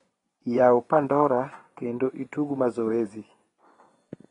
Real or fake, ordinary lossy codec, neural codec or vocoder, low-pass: fake; AAC, 32 kbps; vocoder, 24 kHz, 100 mel bands, Vocos; 10.8 kHz